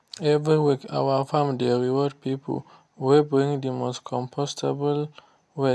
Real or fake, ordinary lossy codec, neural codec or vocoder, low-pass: real; none; none; none